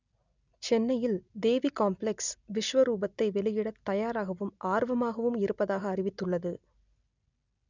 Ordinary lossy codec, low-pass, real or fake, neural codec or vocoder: none; 7.2 kHz; real; none